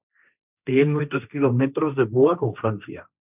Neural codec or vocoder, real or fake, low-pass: codec, 16 kHz, 1.1 kbps, Voila-Tokenizer; fake; 3.6 kHz